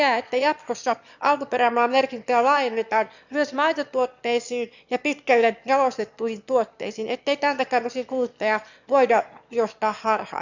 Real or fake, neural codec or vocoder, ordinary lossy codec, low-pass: fake; autoencoder, 22.05 kHz, a latent of 192 numbers a frame, VITS, trained on one speaker; none; 7.2 kHz